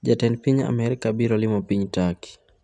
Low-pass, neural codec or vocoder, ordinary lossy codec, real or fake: 10.8 kHz; none; none; real